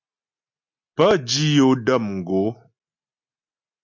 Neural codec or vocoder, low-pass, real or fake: none; 7.2 kHz; real